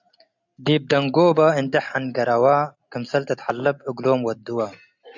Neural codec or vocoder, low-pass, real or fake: none; 7.2 kHz; real